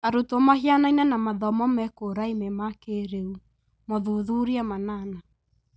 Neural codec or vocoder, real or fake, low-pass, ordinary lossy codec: none; real; none; none